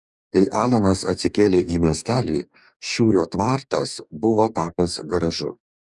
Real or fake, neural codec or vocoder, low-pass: fake; codec, 44.1 kHz, 2.6 kbps, DAC; 10.8 kHz